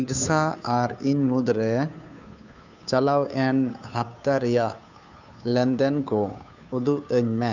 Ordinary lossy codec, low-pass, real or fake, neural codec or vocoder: none; 7.2 kHz; fake; codec, 16 kHz, 2 kbps, FunCodec, trained on Chinese and English, 25 frames a second